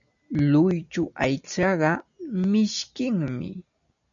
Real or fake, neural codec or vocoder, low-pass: real; none; 7.2 kHz